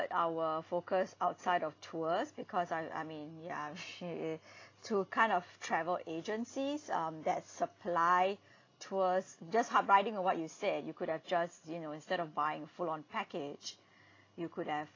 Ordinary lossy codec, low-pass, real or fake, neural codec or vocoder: AAC, 32 kbps; 7.2 kHz; real; none